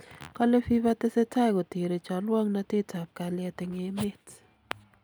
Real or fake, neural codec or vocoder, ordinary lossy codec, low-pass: real; none; none; none